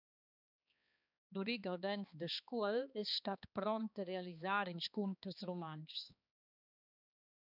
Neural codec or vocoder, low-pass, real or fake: codec, 16 kHz, 2 kbps, X-Codec, HuBERT features, trained on balanced general audio; 5.4 kHz; fake